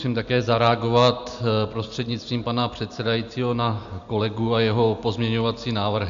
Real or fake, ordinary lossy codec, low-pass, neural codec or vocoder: real; MP3, 64 kbps; 7.2 kHz; none